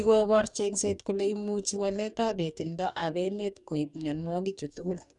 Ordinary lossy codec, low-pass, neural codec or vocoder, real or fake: none; 10.8 kHz; codec, 44.1 kHz, 2.6 kbps, DAC; fake